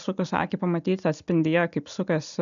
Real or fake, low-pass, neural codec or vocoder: real; 7.2 kHz; none